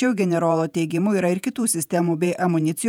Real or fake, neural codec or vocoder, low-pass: fake; vocoder, 48 kHz, 128 mel bands, Vocos; 19.8 kHz